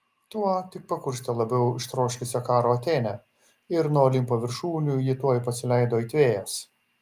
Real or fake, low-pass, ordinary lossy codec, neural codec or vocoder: real; 14.4 kHz; Opus, 32 kbps; none